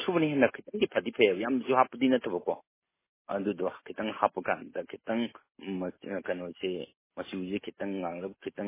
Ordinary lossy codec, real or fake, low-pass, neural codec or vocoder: MP3, 16 kbps; real; 3.6 kHz; none